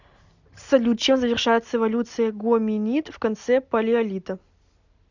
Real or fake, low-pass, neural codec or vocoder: real; 7.2 kHz; none